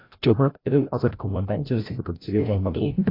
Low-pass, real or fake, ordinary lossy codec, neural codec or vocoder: 5.4 kHz; fake; AAC, 24 kbps; codec, 16 kHz, 0.5 kbps, FreqCodec, larger model